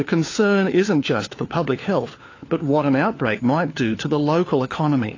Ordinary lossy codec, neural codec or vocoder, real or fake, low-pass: AAC, 32 kbps; codec, 16 kHz, 4 kbps, FunCodec, trained on LibriTTS, 50 frames a second; fake; 7.2 kHz